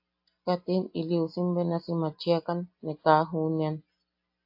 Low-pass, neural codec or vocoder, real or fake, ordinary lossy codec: 5.4 kHz; none; real; AAC, 48 kbps